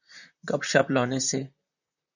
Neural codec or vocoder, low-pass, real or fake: vocoder, 44.1 kHz, 128 mel bands, Pupu-Vocoder; 7.2 kHz; fake